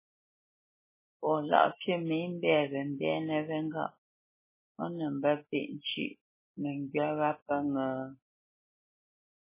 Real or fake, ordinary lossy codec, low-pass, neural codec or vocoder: real; MP3, 16 kbps; 3.6 kHz; none